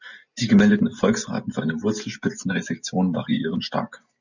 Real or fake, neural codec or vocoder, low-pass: real; none; 7.2 kHz